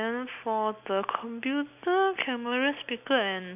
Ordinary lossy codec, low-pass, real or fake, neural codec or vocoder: none; 3.6 kHz; real; none